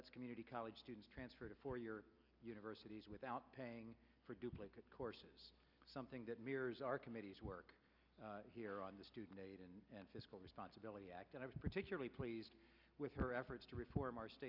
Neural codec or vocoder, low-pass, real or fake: none; 5.4 kHz; real